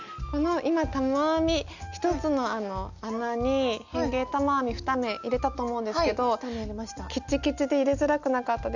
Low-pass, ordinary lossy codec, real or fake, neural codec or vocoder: 7.2 kHz; none; real; none